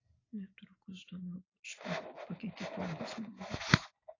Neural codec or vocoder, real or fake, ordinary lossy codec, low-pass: none; real; AAC, 32 kbps; 7.2 kHz